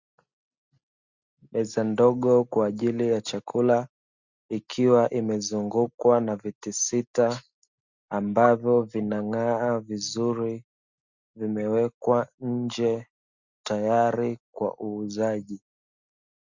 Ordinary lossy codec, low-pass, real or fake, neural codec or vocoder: Opus, 64 kbps; 7.2 kHz; real; none